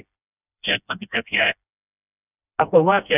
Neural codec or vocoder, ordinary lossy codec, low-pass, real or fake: codec, 16 kHz, 1 kbps, FreqCodec, smaller model; none; 3.6 kHz; fake